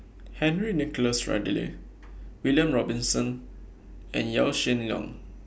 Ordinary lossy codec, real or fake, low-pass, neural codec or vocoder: none; real; none; none